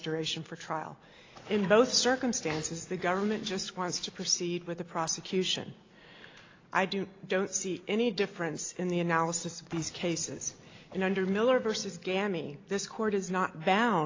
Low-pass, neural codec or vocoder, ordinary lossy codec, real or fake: 7.2 kHz; none; AAC, 32 kbps; real